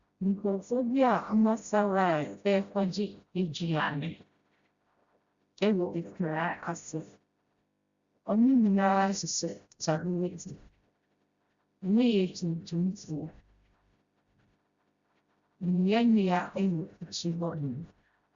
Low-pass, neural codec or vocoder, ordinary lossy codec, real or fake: 7.2 kHz; codec, 16 kHz, 0.5 kbps, FreqCodec, smaller model; Opus, 64 kbps; fake